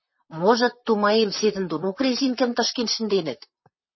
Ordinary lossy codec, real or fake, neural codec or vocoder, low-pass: MP3, 24 kbps; fake; vocoder, 44.1 kHz, 128 mel bands, Pupu-Vocoder; 7.2 kHz